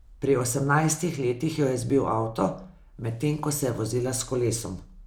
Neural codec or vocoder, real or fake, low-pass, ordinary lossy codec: none; real; none; none